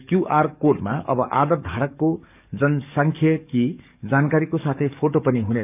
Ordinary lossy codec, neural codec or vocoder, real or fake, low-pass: none; codec, 44.1 kHz, 7.8 kbps, DAC; fake; 3.6 kHz